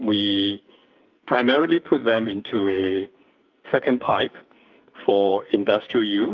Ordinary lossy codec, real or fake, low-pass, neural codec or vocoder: Opus, 24 kbps; fake; 7.2 kHz; codec, 44.1 kHz, 2.6 kbps, SNAC